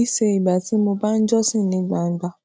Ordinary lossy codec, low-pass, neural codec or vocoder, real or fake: none; none; none; real